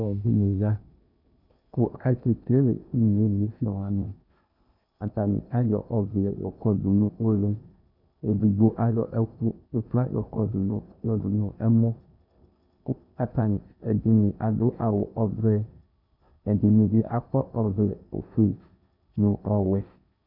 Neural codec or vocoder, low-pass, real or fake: codec, 16 kHz in and 24 kHz out, 0.8 kbps, FocalCodec, streaming, 65536 codes; 5.4 kHz; fake